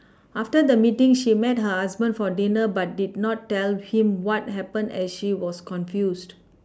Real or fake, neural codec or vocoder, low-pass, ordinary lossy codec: real; none; none; none